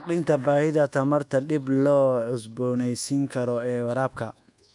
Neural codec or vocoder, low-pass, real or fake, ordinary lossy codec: codec, 24 kHz, 1.2 kbps, DualCodec; 10.8 kHz; fake; none